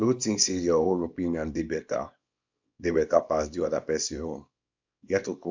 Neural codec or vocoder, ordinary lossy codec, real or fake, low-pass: codec, 24 kHz, 0.9 kbps, WavTokenizer, small release; MP3, 64 kbps; fake; 7.2 kHz